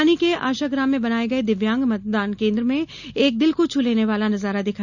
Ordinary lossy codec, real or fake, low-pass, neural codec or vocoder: none; real; 7.2 kHz; none